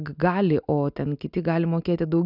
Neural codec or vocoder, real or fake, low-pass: none; real; 5.4 kHz